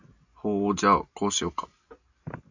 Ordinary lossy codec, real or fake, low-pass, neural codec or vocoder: AAC, 48 kbps; real; 7.2 kHz; none